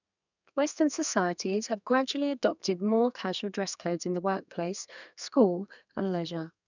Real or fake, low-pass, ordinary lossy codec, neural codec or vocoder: fake; 7.2 kHz; none; codec, 32 kHz, 1.9 kbps, SNAC